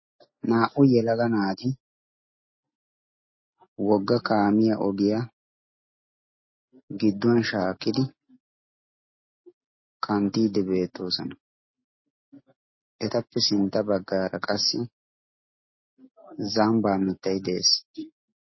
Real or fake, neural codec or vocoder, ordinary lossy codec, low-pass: real; none; MP3, 24 kbps; 7.2 kHz